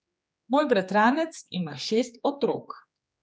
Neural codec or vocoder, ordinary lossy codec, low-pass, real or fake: codec, 16 kHz, 4 kbps, X-Codec, HuBERT features, trained on general audio; none; none; fake